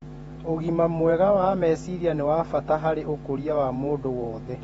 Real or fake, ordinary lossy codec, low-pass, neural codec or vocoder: fake; AAC, 24 kbps; 19.8 kHz; vocoder, 48 kHz, 128 mel bands, Vocos